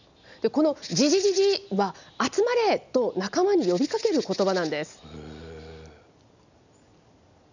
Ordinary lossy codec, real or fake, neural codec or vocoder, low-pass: none; real; none; 7.2 kHz